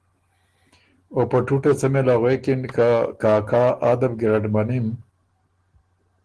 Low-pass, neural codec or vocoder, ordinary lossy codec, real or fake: 10.8 kHz; none; Opus, 16 kbps; real